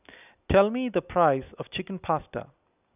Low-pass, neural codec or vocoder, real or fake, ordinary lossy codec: 3.6 kHz; none; real; none